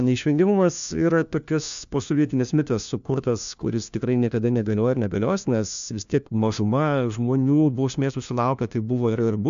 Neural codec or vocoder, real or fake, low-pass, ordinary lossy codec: codec, 16 kHz, 1 kbps, FunCodec, trained on LibriTTS, 50 frames a second; fake; 7.2 kHz; AAC, 96 kbps